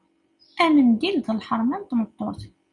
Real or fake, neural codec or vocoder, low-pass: real; none; 10.8 kHz